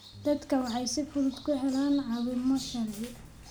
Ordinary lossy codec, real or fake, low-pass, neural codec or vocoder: none; real; none; none